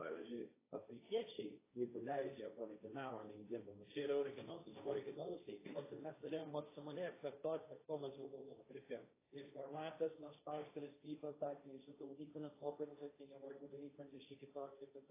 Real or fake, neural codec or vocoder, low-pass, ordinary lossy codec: fake; codec, 16 kHz, 1.1 kbps, Voila-Tokenizer; 3.6 kHz; AAC, 16 kbps